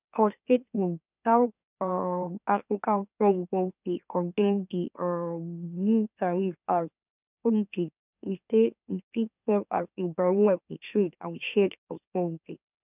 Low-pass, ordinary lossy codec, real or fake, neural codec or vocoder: 3.6 kHz; none; fake; autoencoder, 44.1 kHz, a latent of 192 numbers a frame, MeloTTS